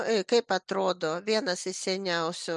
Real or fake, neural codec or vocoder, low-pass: real; none; 10.8 kHz